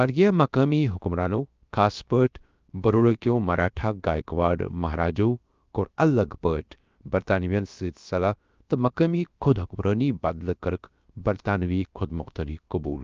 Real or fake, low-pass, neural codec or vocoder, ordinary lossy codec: fake; 7.2 kHz; codec, 16 kHz, about 1 kbps, DyCAST, with the encoder's durations; Opus, 24 kbps